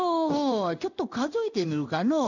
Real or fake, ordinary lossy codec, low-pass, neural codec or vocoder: fake; none; 7.2 kHz; codec, 16 kHz in and 24 kHz out, 1 kbps, XY-Tokenizer